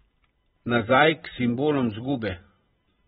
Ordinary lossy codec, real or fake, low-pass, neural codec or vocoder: AAC, 16 kbps; fake; 19.8 kHz; vocoder, 44.1 kHz, 128 mel bands every 512 samples, BigVGAN v2